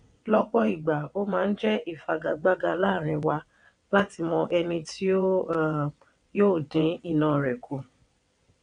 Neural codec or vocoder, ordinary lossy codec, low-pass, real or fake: vocoder, 22.05 kHz, 80 mel bands, WaveNeXt; none; 9.9 kHz; fake